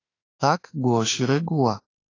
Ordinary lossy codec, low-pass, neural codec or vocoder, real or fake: AAC, 32 kbps; 7.2 kHz; autoencoder, 48 kHz, 32 numbers a frame, DAC-VAE, trained on Japanese speech; fake